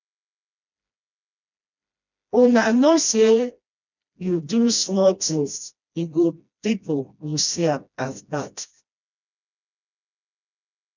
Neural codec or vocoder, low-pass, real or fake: codec, 16 kHz, 1 kbps, FreqCodec, smaller model; 7.2 kHz; fake